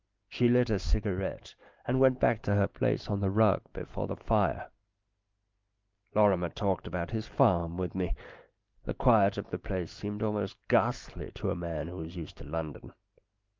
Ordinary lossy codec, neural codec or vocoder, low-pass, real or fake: Opus, 24 kbps; none; 7.2 kHz; real